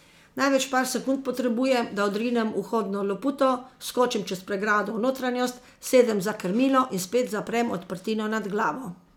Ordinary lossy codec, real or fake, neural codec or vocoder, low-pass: none; real; none; 19.8 kHz